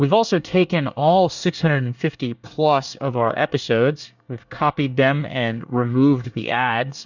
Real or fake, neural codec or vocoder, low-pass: fake; codec, 24 kHz, 1 kbps, SNAC; 7.2 kHz